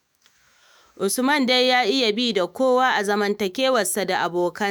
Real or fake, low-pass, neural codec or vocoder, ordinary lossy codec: fake; none; autoencoder, 48 kHz, 128 numbers a frame, DAC-VAE, trained on Japanese speech; none